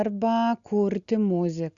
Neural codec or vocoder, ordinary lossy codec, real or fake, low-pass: none; Opus, 64 kbps; real; 7.2 kHz